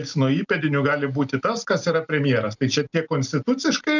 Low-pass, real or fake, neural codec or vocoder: 7.2 kHz; real; none